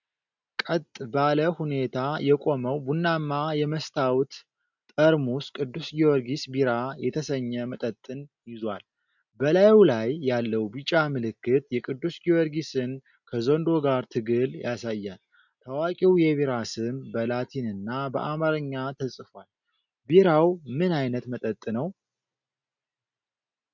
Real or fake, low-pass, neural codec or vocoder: real; 7.2 kHz; none